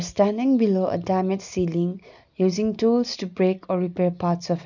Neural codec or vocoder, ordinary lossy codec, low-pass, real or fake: none; none; 7.2 kHz; real